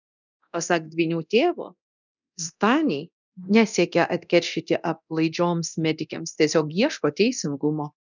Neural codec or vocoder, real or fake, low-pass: codec, 24 kHz, 0.9 kbps, DualCodec; fake; 7.2 kHz